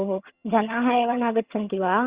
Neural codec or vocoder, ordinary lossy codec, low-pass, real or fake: vocoder, 22.05 kHz, 80 mel bands, HiFi-GAN; Opus, 64 kbps; 3.6 kHz; fake